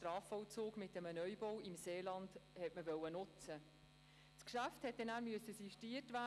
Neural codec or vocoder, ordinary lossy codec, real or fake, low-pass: none; none; real; none